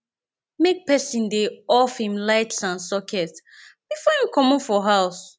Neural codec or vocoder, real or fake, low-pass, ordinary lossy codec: none; real; none; none